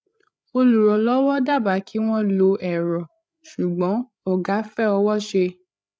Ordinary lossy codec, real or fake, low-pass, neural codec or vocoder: none; fake; none; codec, 16 kHz, 8 kbps, FreqCodec, larger model